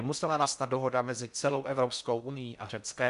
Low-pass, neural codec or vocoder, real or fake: 10.8 kHz; codec, 16 kHz in and 24 kHz out, 0.6 kbps, FocalCodec, streaming, 4096 codes; fake